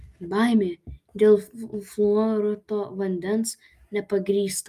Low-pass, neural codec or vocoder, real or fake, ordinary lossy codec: 14.4 kHz; none; real; Opus, 32 kbps